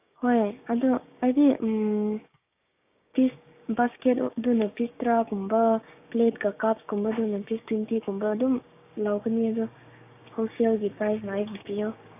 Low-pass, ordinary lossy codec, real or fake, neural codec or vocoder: 3.6 kHz; AAC, 32 kbps; fake; codec, 16 kHz, 6 kbps, DAC